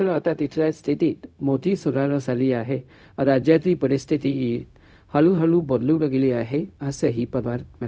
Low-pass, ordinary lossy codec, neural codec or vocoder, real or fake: none; none; codec, 16 kHz, 0.4 kbps, LongCat-Audio-Codec; fake